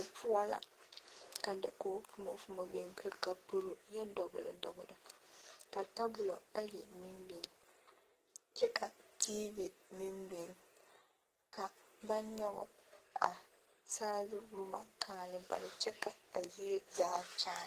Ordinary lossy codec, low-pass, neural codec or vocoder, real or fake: Opus, 16 kbps; 14.4 kHz; codec, 44.1 kHz, 2.6 kbps, SNAC; fake